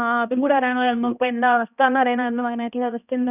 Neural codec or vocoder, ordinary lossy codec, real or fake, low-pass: codec, 16 kHz, 1 kbps, X-Codec, HuBERT features, trained on balanced general audio; none; fake; 3.6 kHz